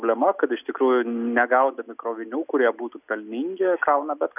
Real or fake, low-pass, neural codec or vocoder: real; 3.6 kHz; none